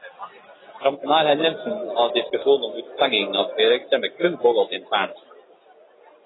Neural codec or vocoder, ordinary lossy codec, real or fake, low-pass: none; AAC, 16 kbps; real; 7.2 kHz